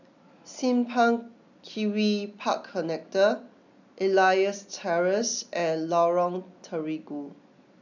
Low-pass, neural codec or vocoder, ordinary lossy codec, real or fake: 7.2 kHz; none; none; real